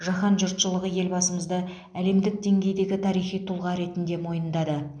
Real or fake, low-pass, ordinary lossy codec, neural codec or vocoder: real; 9.9 kHz; none; none